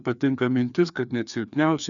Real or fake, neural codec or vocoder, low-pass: fake; codec, 16 kHz, 2 kbps, FreqCodec, larger model; 7.2 kHz